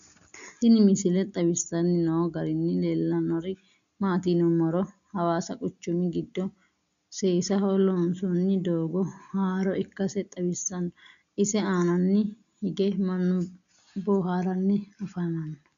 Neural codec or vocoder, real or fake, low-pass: none; real; 7.2 kHz